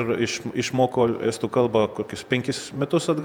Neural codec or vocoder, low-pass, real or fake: vocoder, 48 kHz, 128 mel bands, Vocos; 19.8 kHz; fake